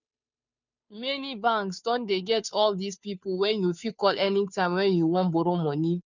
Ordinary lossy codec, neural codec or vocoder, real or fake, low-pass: none; codec, 16 kHz, 2 kbps, FunCodec, trained on Chinese and English, 25 frames a second; fake; 7.2 kHz